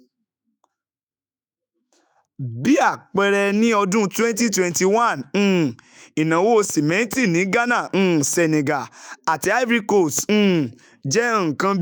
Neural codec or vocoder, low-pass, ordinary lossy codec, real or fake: autoencoder, 48 kHz, 128 numbers a frame, DAC-VAE, trained on Japanese speech; none; none; fake